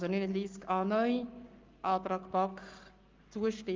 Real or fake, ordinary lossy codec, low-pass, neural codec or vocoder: fake; Opus, 24 kbps; 7.2 kHz; codec, 44.1 kHz, 7.8 kbps, Pupu-Codec